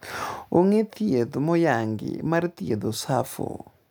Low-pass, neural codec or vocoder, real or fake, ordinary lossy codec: none; none; real; none